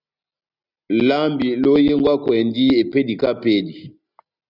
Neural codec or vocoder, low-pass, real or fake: none; 5.4 kHz; real